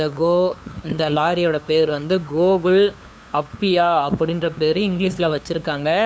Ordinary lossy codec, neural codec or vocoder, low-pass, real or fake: none; codec, 16 kHz, 2 kbps, FunCodec, trained on LibriTTS, 25 frames a second; none; fake